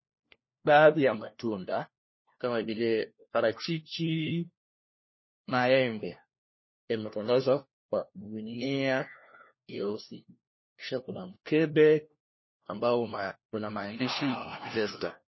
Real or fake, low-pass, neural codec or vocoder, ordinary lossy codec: fake; 7.2 kHz; codec, 16 kHz, 1 kbps, FunCodec, trained on LibriTTS, 50 frames a second; MP3, 24 kbps